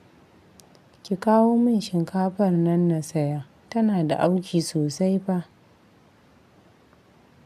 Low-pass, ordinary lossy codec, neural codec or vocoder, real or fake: 14.4 kHz; none; none; real